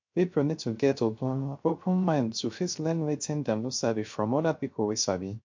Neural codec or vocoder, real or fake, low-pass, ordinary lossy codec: codec, 16 kHz, 0.3 kbps, FocalCodec; fake; 7.2 kHz; MP3, 48 kbps